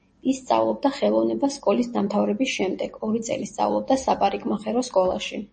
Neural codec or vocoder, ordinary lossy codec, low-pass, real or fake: none; MP3, 32 kbps; 10.8 kHz; real